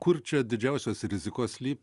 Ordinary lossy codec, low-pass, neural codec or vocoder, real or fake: Opus, 64 kbps; 10.8 kHz; none; real